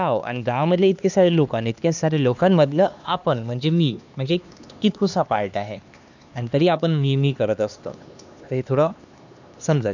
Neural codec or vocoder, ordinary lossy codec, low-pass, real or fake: codec, 16 kHz, 2 kbps, X-Codec, HuBERT features, trained on LibriSpeech; none; 7.2 kHz; fake